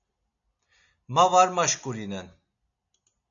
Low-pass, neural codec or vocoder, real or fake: 7.2 kHz; none; real